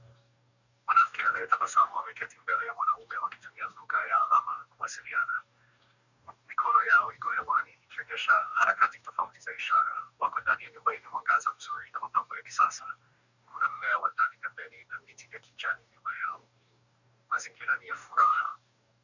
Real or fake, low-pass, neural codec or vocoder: fake; 7.2 kHz; codec, 44.1 kHz, 2.6 kbps, DAC